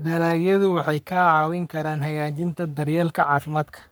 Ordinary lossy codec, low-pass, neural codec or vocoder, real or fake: none; none; codec, 44.1 kHz, 3.4 kbps, Pupu-Codec; fake